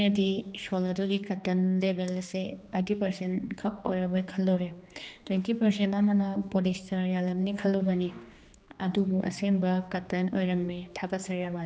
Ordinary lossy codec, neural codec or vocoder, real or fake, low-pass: none; codec, 16 kHz, 2 kbps, X-Codec, HuBERT features, trained on general audio; fake; none